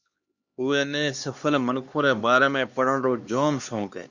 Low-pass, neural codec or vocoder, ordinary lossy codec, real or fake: 7.2 kHz; codec, 16 kHz, 2 kbps, X-Codec, HuBERT features, trained on LibriSpeech; Opus, 64 kbps; fake